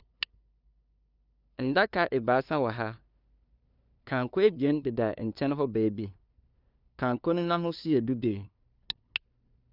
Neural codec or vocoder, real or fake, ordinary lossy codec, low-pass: codec, 16 kHz, 2 kbps, FunCodec, trained on LibriTTS, 25 frames a second; fake; none; 5.4 kHz